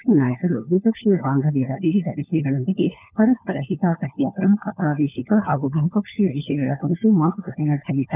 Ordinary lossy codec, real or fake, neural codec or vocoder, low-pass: none; fake; codec, 16 kHz, 4 kbps, FunCodec, trained on LibriTTS, 50 frames a second; 3.6 kHz